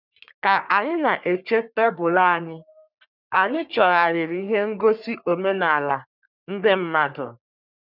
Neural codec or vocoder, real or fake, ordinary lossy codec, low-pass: codec, 44.1 kHz, 3.4 kbps, Pupu-Codec; fake; none; 5.4 kHz